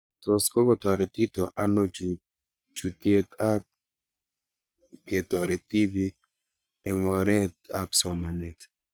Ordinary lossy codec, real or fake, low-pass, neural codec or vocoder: none; fake; none; codec, 44.1 kHz, 3.4 kbps, Pupu-Codec